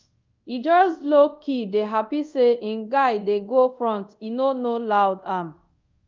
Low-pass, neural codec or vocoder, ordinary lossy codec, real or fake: 7.2 kHz; codec, 24 kHz, 0.5 kbps, DualCodec; Opus, 24 kbps; fake